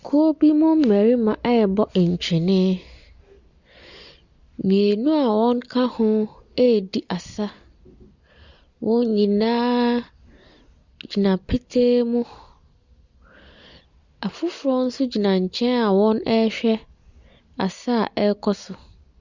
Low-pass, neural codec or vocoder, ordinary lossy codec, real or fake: 7.2 kHz; none; Opus, 64 kbps; real